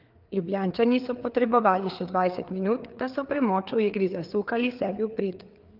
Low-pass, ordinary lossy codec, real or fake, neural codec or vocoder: 5.4 kHz; Opus, 32 kbps; fake; codec, 16 kHz, 4 kbps, FreqCodec, larger model